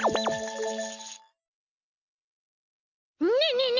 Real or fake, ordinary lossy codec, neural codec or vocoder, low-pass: real; none; none; 7.2 kHz